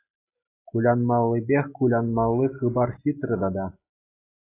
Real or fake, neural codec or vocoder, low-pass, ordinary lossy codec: real; none; 3.6 kHz; AAC, 24 kbps